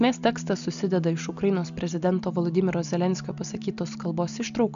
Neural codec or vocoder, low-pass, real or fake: none; 7.2 kHz; real